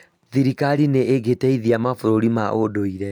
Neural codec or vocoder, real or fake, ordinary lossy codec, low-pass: none; real; none; 19.8 kHz